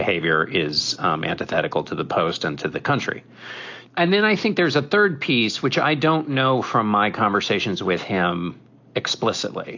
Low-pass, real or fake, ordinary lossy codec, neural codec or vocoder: 7.2 kHz; real; AAC, 48 kbps; none